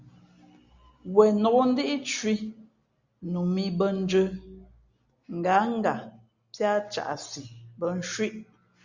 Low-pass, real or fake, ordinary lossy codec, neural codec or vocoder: 7.2 kHz; real; Opus, 64 kbps; none